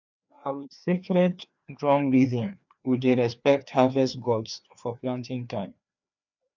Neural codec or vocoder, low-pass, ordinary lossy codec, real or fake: codec, 16 kHz, 2 kbps, FreqCodec, larger model; 7.2 kHz; none; fake